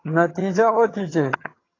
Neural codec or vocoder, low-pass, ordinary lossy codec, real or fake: vocoder, 22.05 kHz, 80 mel bands, HiFi-GAN; 7.2 kHz; AAC, 32 kbps; fake